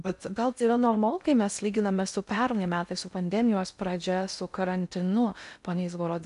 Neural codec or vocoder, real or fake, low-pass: codec, 16 kHz in and 24 kHz out, 0.6 kbps, FocalCodec, streaming, 2048 codes; fake; 10.8 kHz